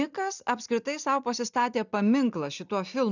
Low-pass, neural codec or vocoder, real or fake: 7.2 kHz; none; real